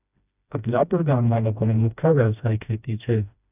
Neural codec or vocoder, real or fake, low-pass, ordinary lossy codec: codec, 16 kHz, 1 kbps, FreqCodec, smaller model; fake; 3.6 kHz; none